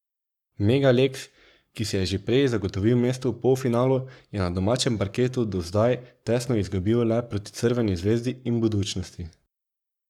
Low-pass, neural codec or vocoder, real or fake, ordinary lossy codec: 19.8 kHz; codec, 44.1 kHz, 7.8 kbps, Pupu-Codec; fake; none